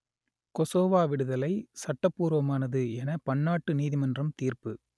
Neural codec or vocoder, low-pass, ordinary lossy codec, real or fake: none; none; none; real